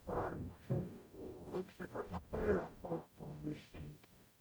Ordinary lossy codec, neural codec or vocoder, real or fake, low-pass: none; codec, 44.1 kHz, 0.9 kbps, DAC; fake; none